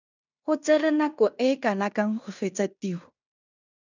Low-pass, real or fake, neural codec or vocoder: 7.2 kHz; fake; codec, 16 kHz in and 24 kHz out, 0.9 kbps, LongCat-Audio-Codec, fine tuned four codebook decoder